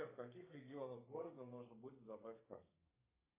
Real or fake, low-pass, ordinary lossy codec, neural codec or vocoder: fake; 3.6 kHz; AAC, 32 kbps; codec, 32 kHz, 1.9 kbps, SNAC